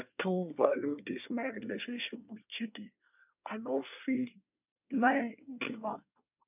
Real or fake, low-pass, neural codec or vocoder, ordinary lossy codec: fake; 3.6 kHz; codec, 24 kHz, 1 kbps, SNAC; none